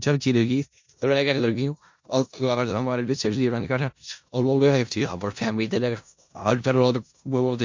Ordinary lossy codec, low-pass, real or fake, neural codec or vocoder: MP3, 48 kbps; 7.2 kHz; fake; codec, 16 kHz in and 24 kHz out, 0.4 kbps, LongCat-Audio-Codec, four codebook decoder